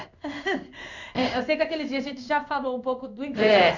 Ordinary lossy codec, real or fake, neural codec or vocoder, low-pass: none; fake; codec, 16 kHz in and 24 kHz out, 1 kbps, XY-Tokenizer; 7.2 kHz